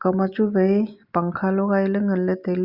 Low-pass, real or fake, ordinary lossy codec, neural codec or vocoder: 5.4 kHz; real; Opus, 64 kbps; none